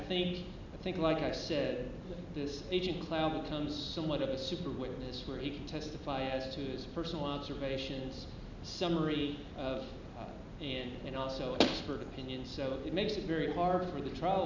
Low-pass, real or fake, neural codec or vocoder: 7.2 kHz; real; none